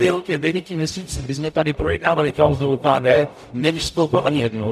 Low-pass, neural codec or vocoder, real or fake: 14.4 kHz; codec, 44.1 kHz, 0.9 kbps, DAC; fake